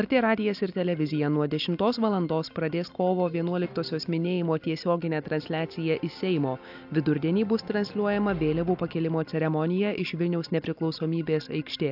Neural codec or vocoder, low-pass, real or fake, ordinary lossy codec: none; 5.4 kHz; real; AAC, 48 kbps